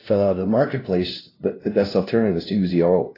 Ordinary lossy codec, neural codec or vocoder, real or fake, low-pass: AAC, 24 kbps; codec, 16 kHz, 0.5 kbps, FunCodec, trained on LibriTTS, 25 frames a second; fake; 5.4 kHz